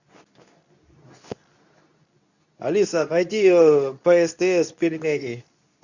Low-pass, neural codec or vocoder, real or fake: 7.2 kHz; codec, 24 kHz, 0.9 kbps, WavTokenizer, medium speech release version 2; fake